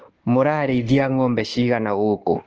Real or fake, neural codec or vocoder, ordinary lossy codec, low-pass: fake; codec, 24 kHz, 1.2 kbps, DualCodec; Opus, 16 kbps; 7.2 kHz